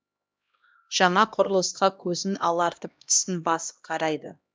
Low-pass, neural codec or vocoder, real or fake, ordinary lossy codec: none; codec, 16 kHz, 1 kbps, X-Codec, HuBERT features, trained on LibriSpeech; fake; none